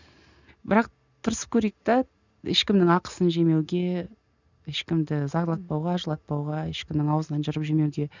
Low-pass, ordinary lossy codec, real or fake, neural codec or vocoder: 7.2 kHz; none; real; none